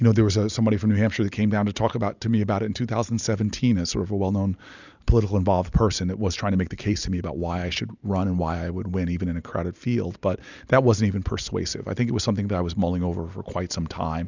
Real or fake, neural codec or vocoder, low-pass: real; none; 7.2 kHz